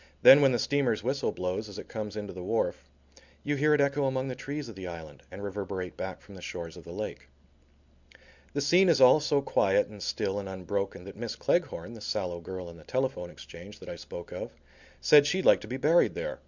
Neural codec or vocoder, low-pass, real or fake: none; 7.2 kHz; real